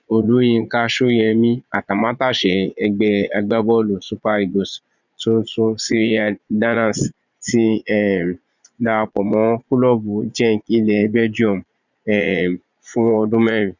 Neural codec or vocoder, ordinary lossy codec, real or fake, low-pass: vocoder, 22.05 kHz, 80 mel bands, Vocos; none; fake; 7.2 kHz